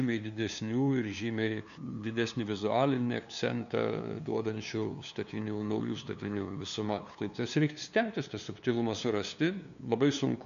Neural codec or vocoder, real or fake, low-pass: codec, 16 kHz, 2 kbps, FunCodec, trained on LibriTTS, 25 frames a second; fake; 7.2 kHz